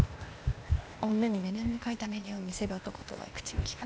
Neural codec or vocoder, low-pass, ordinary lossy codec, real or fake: codec, 16 kHz, 0.8 kbps, ZipCodec; none; none; fake